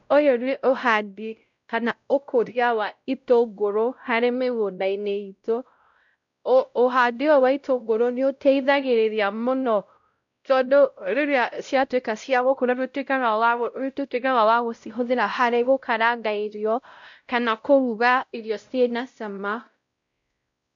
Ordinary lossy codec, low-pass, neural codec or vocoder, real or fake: MP3, 64 kbps; 7.2 kHz; codec, 16 kHz, 0.5 kbps, X-Codec, WavLM features, trained on Multilingual LibriSpeech; fake